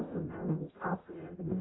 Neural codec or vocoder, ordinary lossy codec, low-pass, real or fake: codec, 44.1 kHz, 0.9 kbps, DAC; AAC, 16 kbps; 7.2 kHz; fake